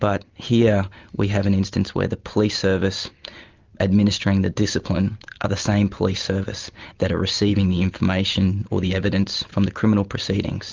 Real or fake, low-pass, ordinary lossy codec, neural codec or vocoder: real; 7.2 kHz; Opus, 32 kbps; none